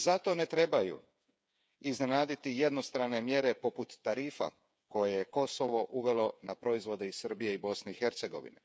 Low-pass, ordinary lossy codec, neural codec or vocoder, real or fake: none; none; codec, 16 kHz, 8 kbps, FreqCodec, smaller model; fake